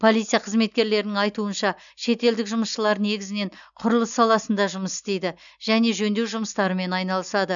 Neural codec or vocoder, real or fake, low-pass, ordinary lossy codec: none; real; 7.2 kHz; none